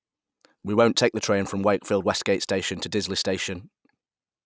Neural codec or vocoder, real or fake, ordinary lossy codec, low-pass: none; real; none; none